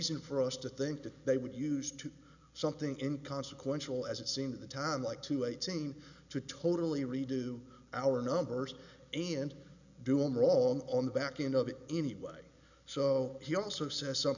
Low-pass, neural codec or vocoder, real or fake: 7.2 kHz; none; real